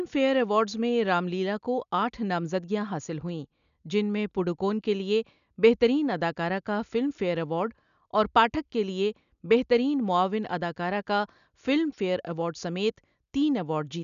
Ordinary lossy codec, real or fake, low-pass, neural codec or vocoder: none; real; 7.2 kHz; none